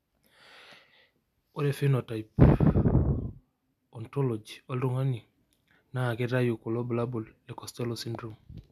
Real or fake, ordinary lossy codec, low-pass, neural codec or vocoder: real; none; 14.4 kHz; none